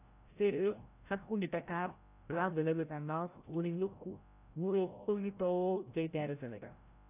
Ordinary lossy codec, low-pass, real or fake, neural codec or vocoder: AAC, 24 kbps; 3.6 kHz; fake; codec, 16 kHz, 0.5 kbps, FreqCodec, larger model